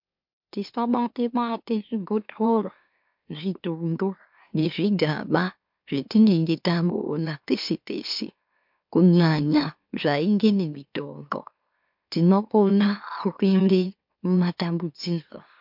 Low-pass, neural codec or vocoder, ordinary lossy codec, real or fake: 5.4 kHz; autoencoder, 44.1 kHz, a latent of 192 numbers a frame, MeloTTS; MP3, 48 kbps; fake